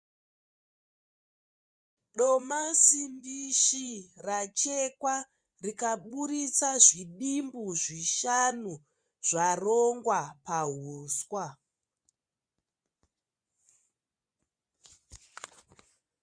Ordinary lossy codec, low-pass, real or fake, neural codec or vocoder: Opus, 64 kbps; 9.9 kHz; fake; vocoder, 24 kHz, 100 mel bands, Vocos